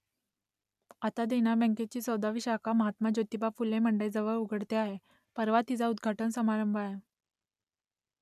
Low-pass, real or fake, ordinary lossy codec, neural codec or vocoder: 14.4 kHz; real; none; none